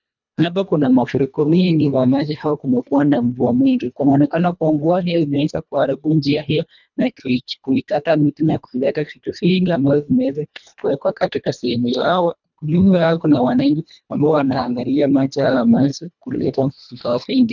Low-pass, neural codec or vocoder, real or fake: 7.2 kHz; codec, 24 kHz, 1.5 kbps, HILCodec; fake